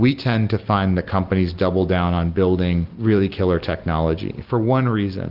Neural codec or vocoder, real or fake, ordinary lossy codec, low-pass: none; real; Opus, 32 kbps; 5.4 kHz